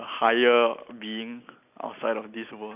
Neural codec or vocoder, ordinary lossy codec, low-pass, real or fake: none; none; 3.6 kHz; real